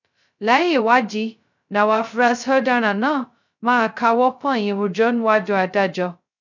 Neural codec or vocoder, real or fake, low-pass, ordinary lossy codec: codec, 16 kHz, 0.2 kbps, FocalCodec; fake; 7.2 kHz; none